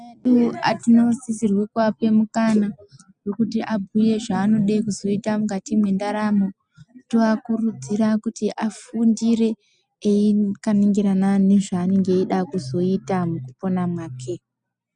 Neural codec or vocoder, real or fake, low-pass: none; real; 9.9 kHz